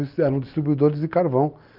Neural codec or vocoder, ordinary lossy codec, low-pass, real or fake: none; Opus, 32 kbps; 5.4 kHz; real